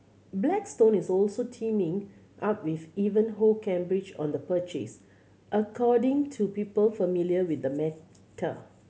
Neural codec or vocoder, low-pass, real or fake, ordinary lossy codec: none; none; real; none